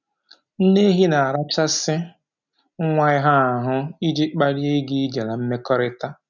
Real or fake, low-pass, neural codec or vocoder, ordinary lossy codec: real; 7.2 kHz; none; none